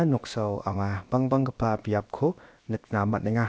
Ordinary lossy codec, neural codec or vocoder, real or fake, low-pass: none; codec, 16 kHz, about 1 kbps, DyCAST, with the encoder's durations; fake; none